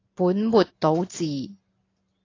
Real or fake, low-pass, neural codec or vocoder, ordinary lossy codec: real; 7.2 kHz; none; AAC, 32 kbps